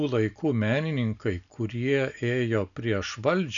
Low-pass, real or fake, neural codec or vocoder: 7.2 kHz; real; none